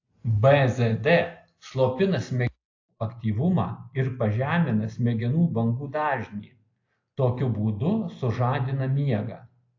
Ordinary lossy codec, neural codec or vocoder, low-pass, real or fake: AAC, 48 kbps; none; 7.2 kHz; real